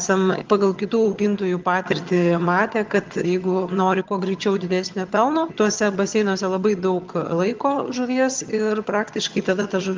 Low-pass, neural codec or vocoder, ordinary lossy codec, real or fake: 7.2 kHz; vocoder, 22.05 kHz, 80 mel bands, HiFi-GAN; Opus, 32 kbps; fake